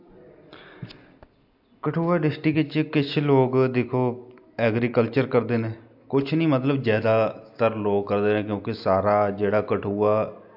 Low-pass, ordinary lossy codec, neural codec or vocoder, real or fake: 5.4 kHz; AAC, 48 kbps; none; real